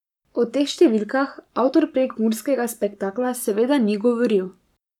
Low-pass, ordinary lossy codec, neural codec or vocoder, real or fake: 19.8 kHz; none; codec, 44.1 kHz, 7.8 kbps, Pupu-Codec; fake